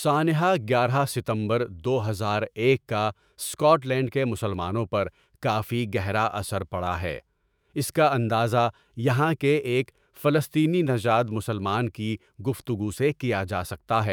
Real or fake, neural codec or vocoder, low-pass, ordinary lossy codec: fake; autoencoder, 48 kHz, 128 numbers a frame, DAC-VAE, trained on Japanese speech; none; none